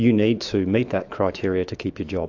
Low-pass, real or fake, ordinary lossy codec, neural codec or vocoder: 7.2 kHz; fake; AAC, 48 kbps; autoencoder, 48 kHz, 128 numbers a frame, DAC-VAE, trained on Japanese speech